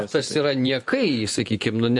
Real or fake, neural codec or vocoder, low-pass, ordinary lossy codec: fake; vocoder, 24 kHz, 100 mel bands, Vocos; 10.8 kHz; MP3, 48 kbps